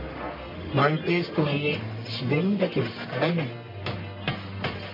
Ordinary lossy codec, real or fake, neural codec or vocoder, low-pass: MP3, 24 kbps; fake; codec, 44.1 kHz, 1.7 kbps, Pupu-Codec; 5.4 kHz